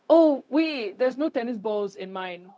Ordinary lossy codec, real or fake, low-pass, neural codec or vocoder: none; fake; none; codec, 16 kHz, 0.4 kbps, LongCat-Audio-Codec